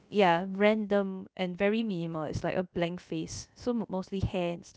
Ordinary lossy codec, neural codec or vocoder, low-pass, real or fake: none; codec, 16 kHz, about 1 kbps, DyCAST, with the encoder's durations; none; fake